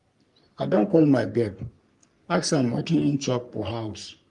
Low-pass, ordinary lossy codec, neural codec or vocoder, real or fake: 10.8 kHz; Opus, 32 kbps; codec, 44.1 kHz, 3.4 kbps, Pupu-Codec; fake